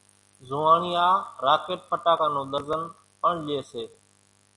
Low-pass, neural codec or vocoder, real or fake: 10.8 kHz; none; real